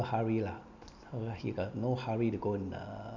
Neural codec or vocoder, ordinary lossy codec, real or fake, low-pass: none; none; real; 7.2 kHz